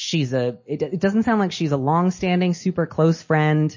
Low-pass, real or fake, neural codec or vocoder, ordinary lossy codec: 7.2 kHz; real; none; MP3, 32 kbps